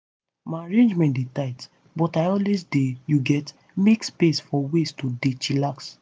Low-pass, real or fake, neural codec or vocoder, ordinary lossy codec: none; real; none; none